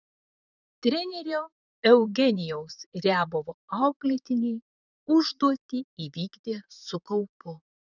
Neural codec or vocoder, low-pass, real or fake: vocoder, 44.1 kHz, 128 mel bands, Pupu-Vocoder; 7.2 kHz; fake